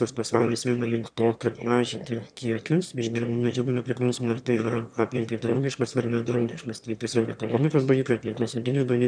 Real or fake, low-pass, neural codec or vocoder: fake; 9.9 kHz; autoencoder, 22.05 kHz, a latent of 192 numbers a frame, VITS, trained on one speaker